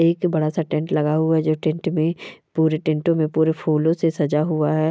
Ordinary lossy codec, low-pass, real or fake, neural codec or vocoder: none; none; real; none